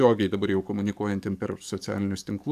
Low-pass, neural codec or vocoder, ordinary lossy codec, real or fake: 14.4 kHz; codec, 44.1 kHz, 7.8 kbps, DAC; AAC, 96 kbps; fake